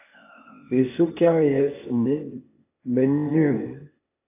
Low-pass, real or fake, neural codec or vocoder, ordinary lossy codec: 3.6 kHz; fake; codec, 16 kHz, 0.8 kbps, ZipCodec; AAC, 24 kbps